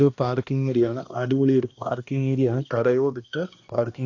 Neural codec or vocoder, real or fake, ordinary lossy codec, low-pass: codec, 16 kHz, 1 kbps, X-Codec, HuBERT features, trained on balanced general audio; fake; AAC, 48 kbps; 7.2 kHz